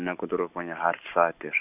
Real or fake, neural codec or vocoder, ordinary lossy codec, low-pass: real; none; none; 3.6 kHz